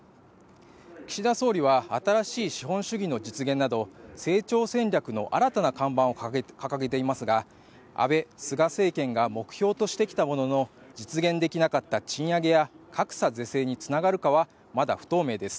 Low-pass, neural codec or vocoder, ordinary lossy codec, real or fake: none; none; none; real